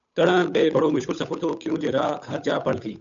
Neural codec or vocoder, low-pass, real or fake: codec, 16 kHz, 8 kbps, FunCodec, trained on Chinese and English, 25 frames a second; 7.2 kHz; fake